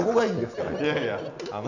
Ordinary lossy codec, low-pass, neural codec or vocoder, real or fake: none; 7.2 kHz; none; real